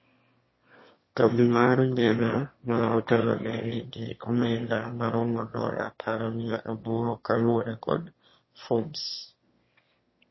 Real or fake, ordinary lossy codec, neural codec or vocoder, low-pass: fake; MP3, 24 kbps; autoencoder, 22.05 kHz, a latent of 192 numbers a frame, VITS, trained on one speaker; 7.2 kHz